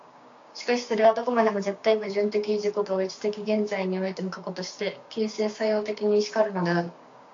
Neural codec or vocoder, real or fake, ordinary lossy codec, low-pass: codec, 16 kHz, 6 kbps, DAC; fake; AAC, 48 kbps; 7.2 kHz